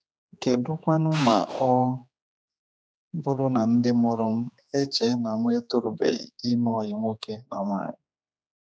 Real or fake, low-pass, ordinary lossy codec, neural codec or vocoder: fake; none; none; codec, 16 kHz, 2 kbps, X-Codec, HuBERT features, trained on general audio